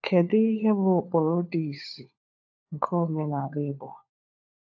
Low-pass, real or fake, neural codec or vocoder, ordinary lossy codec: 7.2 kHz; fake; codec, 16 kHz, 4 kbps, FunCodec, trained on LibriTTS, 50 frames a second; AAC, 48 kbps